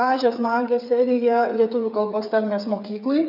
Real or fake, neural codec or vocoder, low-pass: fake; codec, 16 kHz, 8 kbps, FreqCodec, smaller model; 5.4 kHz